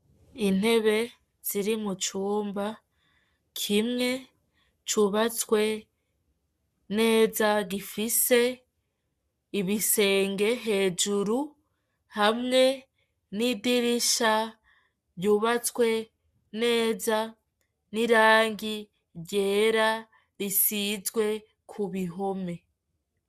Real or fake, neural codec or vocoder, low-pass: fake; codec, 44.1 kHz, 7.8 kbps, Pupu-Codec; 14.4 kHz